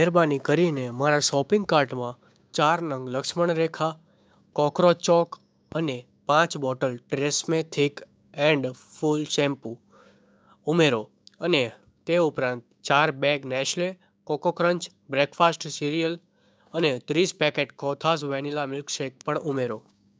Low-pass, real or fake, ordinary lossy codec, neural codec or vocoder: none; fake; none; codec, 16 kHz, 6 kbps, DAC